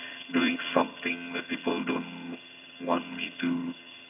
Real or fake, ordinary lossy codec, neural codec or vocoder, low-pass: fake; none; vocoder, 22.05 kHz, 80 mel bands, HiFi-GAN; 3.6 kHz